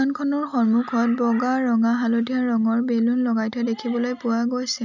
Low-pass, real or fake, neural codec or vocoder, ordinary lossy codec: 7.2 kHz; real; none; none